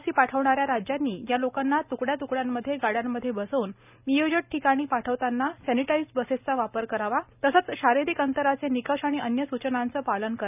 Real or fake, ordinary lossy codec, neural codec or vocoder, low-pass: real; none; none; 3.6 kHz